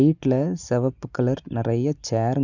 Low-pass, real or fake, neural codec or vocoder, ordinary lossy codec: 7.2 kHz; real; none; none